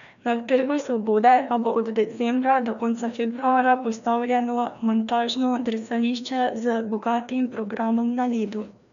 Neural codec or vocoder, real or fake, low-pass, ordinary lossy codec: codec, 16 kHz, 1 kbps, FreqCodec, larger model; fake; 7.2 kHz; none